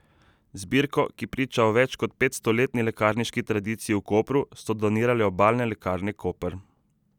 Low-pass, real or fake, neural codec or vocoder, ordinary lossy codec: 19.8 kHz; real; none; none